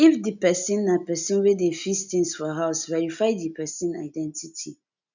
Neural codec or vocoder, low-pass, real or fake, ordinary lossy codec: none; 7.2 kHz; real; none